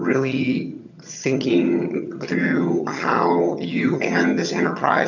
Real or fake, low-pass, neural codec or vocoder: fake; 7.2 kHz; vocoder, 22.05 kHz, 80 mel bands, HiFi-GAN